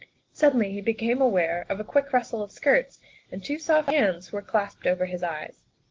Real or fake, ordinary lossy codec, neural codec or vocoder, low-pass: real; Opus, 16 kbps; none; 7.2 kHz